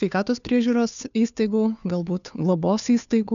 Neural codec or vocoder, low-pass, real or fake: codec, 16 kHz, 2 kbps, FunCodec, trained on Chinese and English, 25 frames a second; 7.2 kHz; fake